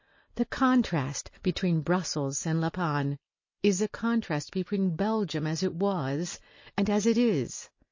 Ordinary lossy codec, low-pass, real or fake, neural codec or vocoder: MP3, 32 kbps; 7.2 kHz; real; none